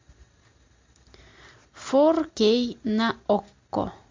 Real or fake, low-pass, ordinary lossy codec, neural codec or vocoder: real; 7.2 kHz; MP3, 48 kbps; none